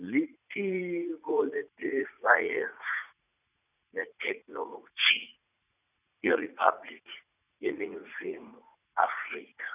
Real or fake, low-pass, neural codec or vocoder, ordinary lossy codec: fake; 3.6 kHz; vocoder, 44.1 kHz, 80 mel bands, Vocos; none